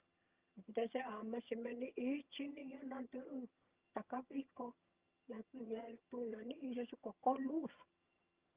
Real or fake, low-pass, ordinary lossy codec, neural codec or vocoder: fake; 3.6 kHz; Opus, 24 kbps; vocoder, 22.05 kHz, 80 mel bands, HiFi-GAN